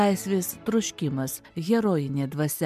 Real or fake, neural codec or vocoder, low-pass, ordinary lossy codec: real; none; 14.4 kHz; MP3, 96 kbps